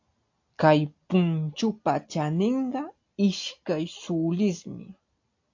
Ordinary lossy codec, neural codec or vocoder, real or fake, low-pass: AAC, 48 kbps; none; real; 7.2 kHz